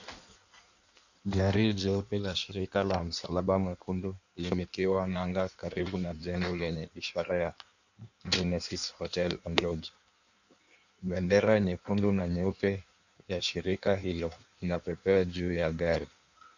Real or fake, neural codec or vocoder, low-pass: fake; codec, 16 kHz in and 24 kHz out, 1.1 kbps, FireRedTTS-2 codec; 7.2 kHz